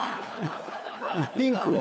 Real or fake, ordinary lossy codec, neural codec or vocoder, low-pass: fake; none; codec, 16 kHz, 4 kbps, FunCodec, trained on LibriTTS, 50 frames a second; none